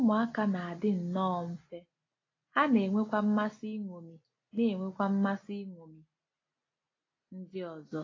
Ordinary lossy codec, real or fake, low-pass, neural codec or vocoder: AAC, 32 kbps; real; 7.2 kHz; none